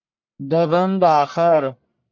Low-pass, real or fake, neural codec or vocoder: 7.2 kHz; fake; codec, 44.1 kHz, 1.7 kbps, Pupu-Codec